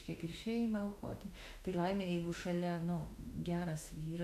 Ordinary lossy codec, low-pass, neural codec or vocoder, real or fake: AAC, 96 kbps; 14.4 kHz; autoencoder, 48 kHz, 32 numbers a frame, DAC-VAE, trained on Japanese speech; fake